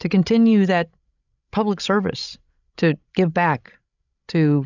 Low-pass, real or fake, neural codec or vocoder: 7.2 kHz; fake; codec, 16 kHz, 16 kbps, FreqCodec, larger model